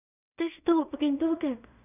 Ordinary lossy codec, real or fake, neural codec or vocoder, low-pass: none; fake; codec, 16 kHz in and 24 kHz out, 0.4 kbps, LongCat-Audio-Codec, two codebook decoder; 3.6 kHz